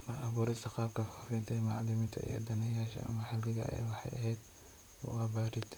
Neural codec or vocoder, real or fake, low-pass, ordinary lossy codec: vocoder, 44.1 kHz, 128 mel bands, Pupu-Vocoder; fake; none; none